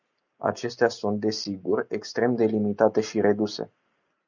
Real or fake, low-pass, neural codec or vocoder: real; 7.2 kHz; none